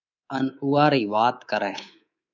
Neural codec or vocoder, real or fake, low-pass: codec, 24 kHz, 3.1 kbps, DualCodec; fake; 7.2 kHz